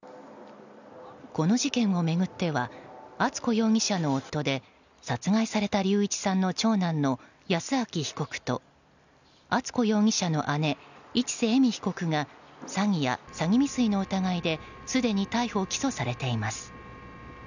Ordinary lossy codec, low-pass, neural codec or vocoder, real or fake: none; 7.2 kHz; none; real